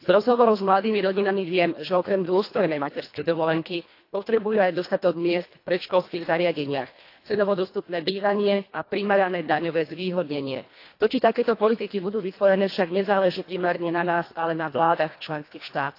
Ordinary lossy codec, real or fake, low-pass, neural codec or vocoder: AAC, 32 kbps; fake; 5.4 kHz; codec, 24 kHz, 1.5 kbps, HILCodec